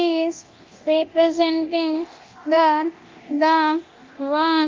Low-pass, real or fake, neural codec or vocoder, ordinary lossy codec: 7.2 kHz; fake; codec, 24 kHz, 0.9 kbps, DualCodec; Opus, 32 kbps